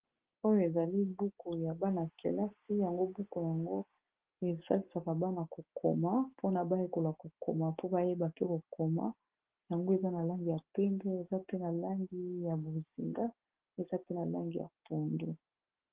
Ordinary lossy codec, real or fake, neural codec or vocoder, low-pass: Opus, 16 kbps; real; none; 3.6 kHz